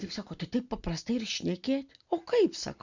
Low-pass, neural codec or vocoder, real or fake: 7.2 kHz; none; real